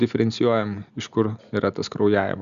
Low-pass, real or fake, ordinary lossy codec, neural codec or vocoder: 7.2 kHz; real; Opus, 64 kbps; none